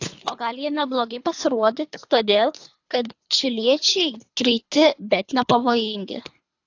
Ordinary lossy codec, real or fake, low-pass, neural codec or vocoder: AAC, 48 kbps; fake; 7.2 kHz; codec, 24 kHz, 3 kbps, HILCodec